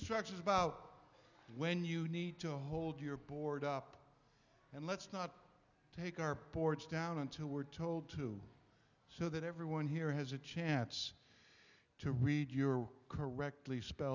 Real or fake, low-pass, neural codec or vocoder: real; 7.2 kHz; none